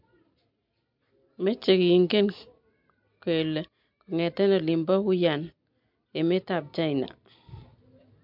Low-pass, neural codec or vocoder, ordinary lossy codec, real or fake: 5.4 kHz; none; MP3, 48 kbps; real